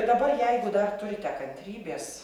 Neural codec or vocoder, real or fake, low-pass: vocoder, 48 kHz, 128 mel bands, Vocos; fake; 19.8 kHz